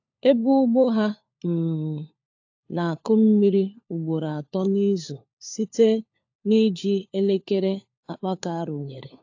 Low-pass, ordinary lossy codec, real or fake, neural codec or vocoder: 7.2 kHz; AAC, 48 kbps; fake; codec, 16 kHz, 4 kbps, FunCodec, trained on LibriTTS, 50 frames a second